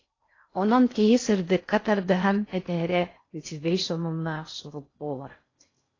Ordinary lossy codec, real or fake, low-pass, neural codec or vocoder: AAC, 32 kbps; fake; 7.2 kHz; codec, 16 kHz in and 24 kHz out, 0.6 kbps, FocalCodec, streaming, 4096 codes